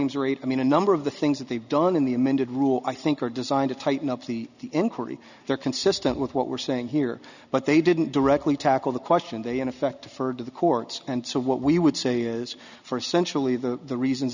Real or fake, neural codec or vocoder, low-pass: real; none; 7.2 kHz